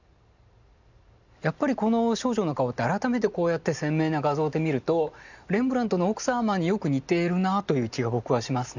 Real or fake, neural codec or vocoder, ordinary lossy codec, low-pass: real; none; none; 7.2 kHz